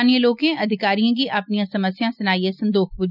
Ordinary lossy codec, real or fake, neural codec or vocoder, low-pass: none; real; none; 5.4 kHz